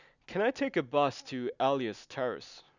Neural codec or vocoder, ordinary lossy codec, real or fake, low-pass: none; none; real; 7.2 kHz